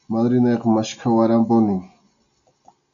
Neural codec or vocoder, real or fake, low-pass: none; real; 7.2 kHz